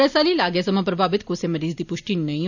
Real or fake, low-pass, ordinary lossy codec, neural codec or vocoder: real; 7.2 kHz; none; none